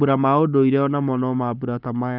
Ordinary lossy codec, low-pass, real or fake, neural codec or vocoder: none; 5.4 kHz; fake; codec, 44.1 kHz, 7.8 kbps, Pupu-Codec